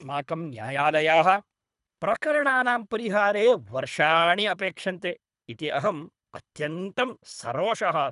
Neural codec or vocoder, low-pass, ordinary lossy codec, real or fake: codec, 24 kHz, 3 kbps, HILCodec; 10.8 kHz; none; fake